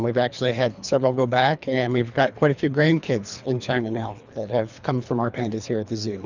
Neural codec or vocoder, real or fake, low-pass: codec, 24 kHz, 3 kbps, HILCodec; fake; 7.2 kHz